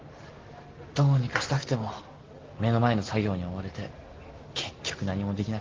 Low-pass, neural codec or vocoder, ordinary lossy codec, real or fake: 7.2 kHz; none; Opus, 16 kbps; real